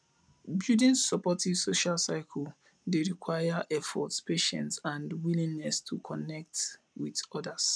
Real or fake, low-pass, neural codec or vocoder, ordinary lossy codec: real; none; none; none